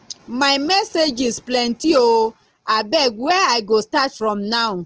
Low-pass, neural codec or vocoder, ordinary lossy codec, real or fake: 7.2 kHz; none; Opus, 16 kbps; real